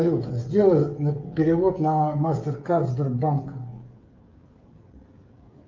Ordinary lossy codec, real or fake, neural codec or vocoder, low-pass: Opus, 24 kbps; fake; codec, 16 kHz, 16 kbps, FreqCodec, smaller model; 7.2 kHz